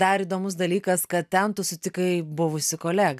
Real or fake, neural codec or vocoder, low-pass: real; none; 14.4 kHz